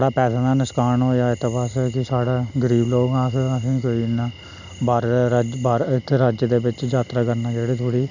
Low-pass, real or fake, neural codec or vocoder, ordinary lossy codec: 7.2 kHz; real; none; none